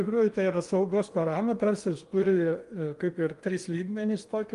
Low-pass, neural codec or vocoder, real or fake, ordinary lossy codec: 10.8 kHz; codec, 16 kHz in and 24 kHz out, 0.8 kbps, FocalCodec, streaming, 65536 codes; fake; Opus, 32 kbps